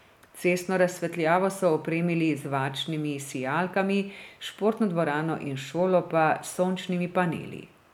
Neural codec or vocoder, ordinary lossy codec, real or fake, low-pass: none; none; real; 19.8 kHz